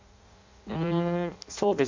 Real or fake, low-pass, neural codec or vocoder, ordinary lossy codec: fake; 7.2 kHz; codec, 16 kHz in and 24 kHz out, 0.6 kbps, FireRedTTS-2 codec; MP3, 64 kbps